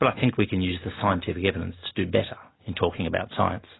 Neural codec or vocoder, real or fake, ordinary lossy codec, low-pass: none; real; AAC, 16 kbps; 7.2 kHz